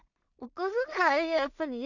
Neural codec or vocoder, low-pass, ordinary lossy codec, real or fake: codec, 16 kHz in and 24 kHz out, 0.4 kbps, LongCat-Audio-Codec, two codebook decoder; 7.2 kHz; none; fake